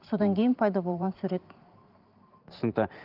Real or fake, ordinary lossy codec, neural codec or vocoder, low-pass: fake; Opus, 32 kbps; vocoder, 44.1 kHz, 128 mel bands every 512 samples, BigVGAN v2; 5.4 kHz